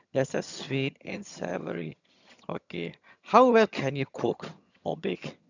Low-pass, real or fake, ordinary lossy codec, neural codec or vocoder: 7.2 kHz; fake; none; vocoder, 22.05 kHz, 80 mel bands, HiFi-GAN